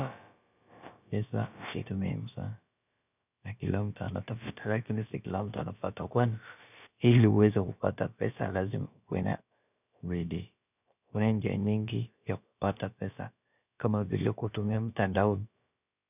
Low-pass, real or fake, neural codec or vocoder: 3.6 kHz; fake; codec, 16 kHz, about 1 kbps, DyCAST, with the encoder's durations